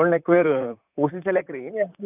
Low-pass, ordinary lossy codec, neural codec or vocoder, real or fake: 3.6 kHz; none; vocoder, 44.1 kHz, 128 mel bands, Pupu-Vocoder; fake